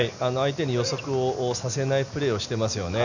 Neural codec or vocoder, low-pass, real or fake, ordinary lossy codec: none; 7.2 kHz; real; none